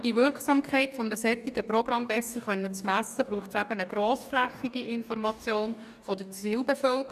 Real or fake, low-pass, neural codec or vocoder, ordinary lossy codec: fake; 14.4 kHz; codec, 44.1 kHz, 2.6 kbps, DAC; none